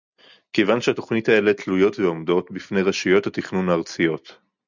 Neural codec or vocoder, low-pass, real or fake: none; 7.2 kHz; real